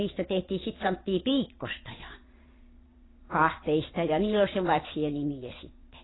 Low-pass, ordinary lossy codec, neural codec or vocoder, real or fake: 7.2 kHz; AAC, 16 kbps; vocoder, 22.05 kHz, 80 mel bands, Vocos; fake